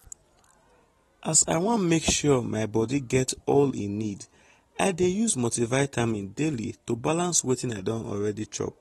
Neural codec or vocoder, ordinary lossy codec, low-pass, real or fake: none; AAC, 32 kbps; 14.4 kHz; real